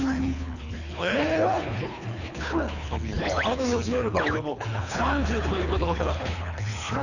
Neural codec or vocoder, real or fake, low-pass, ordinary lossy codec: codec, 24 kHz, 3 kbps, HILCodec; fake; 7.2 kHz; none